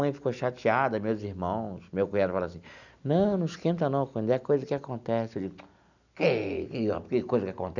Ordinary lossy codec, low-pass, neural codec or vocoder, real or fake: none; 7.2 kHz; none; real